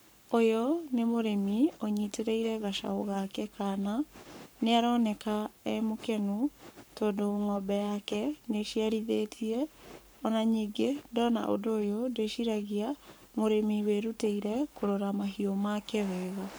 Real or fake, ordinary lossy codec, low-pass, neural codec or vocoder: fake; none; none; codec, 44.1 kHz, 7.8 kbps, Pupu-Codec